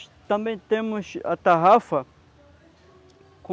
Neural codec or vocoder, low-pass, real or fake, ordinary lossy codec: none; none; real; none